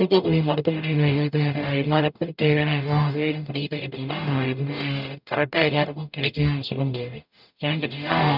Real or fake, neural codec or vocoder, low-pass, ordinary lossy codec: fake; codec, 44.1 kHz, 0.9 kbps, DAC; 5.4 kHz; none